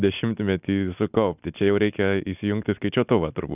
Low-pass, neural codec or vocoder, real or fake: 3.6 kHz; none; real